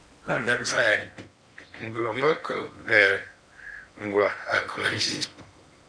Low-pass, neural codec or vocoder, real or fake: 9.9 kHz; codec, 16 kHz in and 24 kHz out, 0.8 kbps, FocalCodec, streaming, 65536 codes; fake